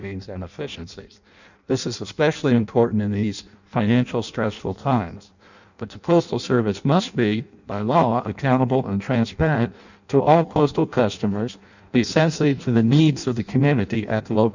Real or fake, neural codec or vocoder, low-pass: fake; codec, 16 kHz in and 24 kHz out, 0.6 kbps, FireRedTTS-2 codec; 7.2 kHz